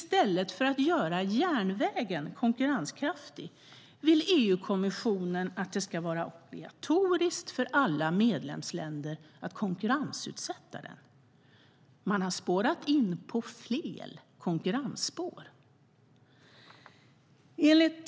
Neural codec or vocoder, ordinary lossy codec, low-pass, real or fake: none; none; none; real